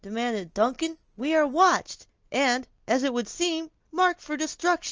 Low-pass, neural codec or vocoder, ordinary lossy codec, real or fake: 7.2 kHz; none; Opus, 24 kbps; real